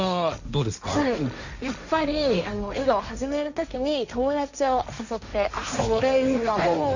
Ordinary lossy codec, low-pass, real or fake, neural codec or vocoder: none; 7.2 kHz; fake; codec, 16 kHz, 1.1 kbps, Voila-Tokenizer